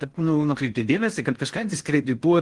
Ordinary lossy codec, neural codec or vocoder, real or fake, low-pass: Opus, 24 kbps; codec, 16 kHz in and 24 kHz out, 0.6 kbps, FocalCodec, streaming, 4096 codes; fake; 10.8 kHz